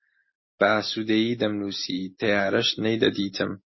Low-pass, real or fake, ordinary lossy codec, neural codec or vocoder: 7.2 kHz; real; MP3, 24 kbps; none